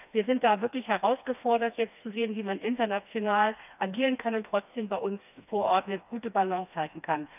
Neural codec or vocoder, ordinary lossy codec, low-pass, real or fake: codec, 16 kHz, 2 kbps, FreqCodec, smaller model; none; 3.6 kHz; fake